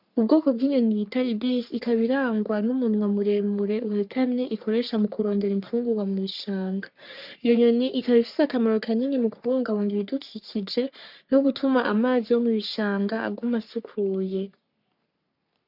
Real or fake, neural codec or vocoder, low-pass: fake; codec, 44.1 kHz, 3.4 kbps, Pupu-Codec; 5.4 kHz